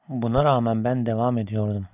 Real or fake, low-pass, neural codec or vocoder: real; 3.6 kHz; none